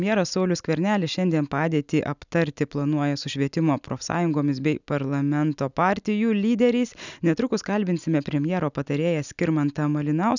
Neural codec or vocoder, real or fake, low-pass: none; real; 7.2 kHz